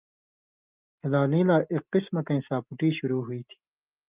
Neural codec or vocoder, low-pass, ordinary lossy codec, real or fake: none; 3.6 kHz; Opus, 24 kbps; real